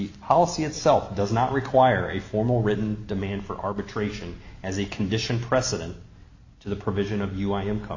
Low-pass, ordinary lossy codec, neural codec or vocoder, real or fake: 7.2 kHz; AAC, 48 kbps; none; real